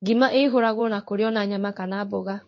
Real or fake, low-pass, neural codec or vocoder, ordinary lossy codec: fake; 7.2 kHz; codec, 16 kHz in and 24 kHz out, 1 kbps, XY-Tokenizer; MP3, 32 kbps